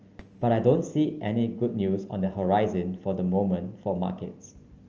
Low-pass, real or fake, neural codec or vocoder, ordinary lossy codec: 7.2 kHz; real; none; Opus, 24 kbps